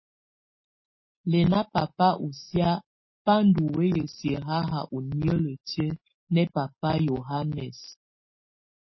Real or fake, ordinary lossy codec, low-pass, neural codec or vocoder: real; MP3, 24 kbps; 7.2 kHz; none